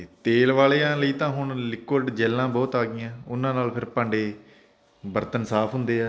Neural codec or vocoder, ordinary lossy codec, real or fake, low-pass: none; none; real; none